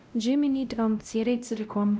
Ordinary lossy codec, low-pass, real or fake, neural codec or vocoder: none; none; fake; codec, 16 kHz, 0.5 kbps, X-Codec, WavLM features, trained on Multilingual LibriSpeech